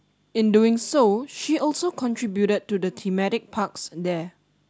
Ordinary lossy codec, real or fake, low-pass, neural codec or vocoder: none; real; none; none